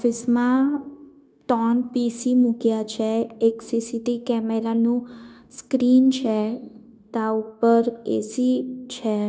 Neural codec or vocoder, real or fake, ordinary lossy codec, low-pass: codec, 16 kHz, 0.9 kbps, LongCat-Audio-Codec; fake; none; none